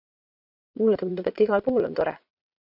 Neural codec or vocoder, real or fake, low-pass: vocoder, 22.05 kHz, 80 mel bands, Vocos; fake; 5.4 kHz